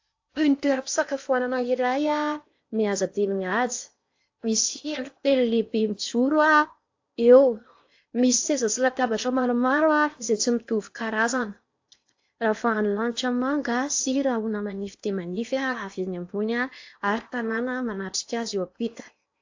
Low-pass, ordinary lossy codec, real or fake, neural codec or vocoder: 7.2 kHz; AAC, 48 kbps; fake; codec, 16 kHz in and 24 kHz out, 0.8 kbps, FocalCodec, streaming, 65536 codes